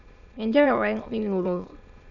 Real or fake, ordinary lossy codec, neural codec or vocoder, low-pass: fake; none; autoencoder, 22.05 kHz, a latent of 192 numbers a frame, VITS, trained on many speakers; 7.2 kHz